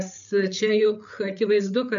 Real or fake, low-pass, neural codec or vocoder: fake; 7.2 kHz; codec, 16 kHz, 8 kbps, FreqCodec, larger model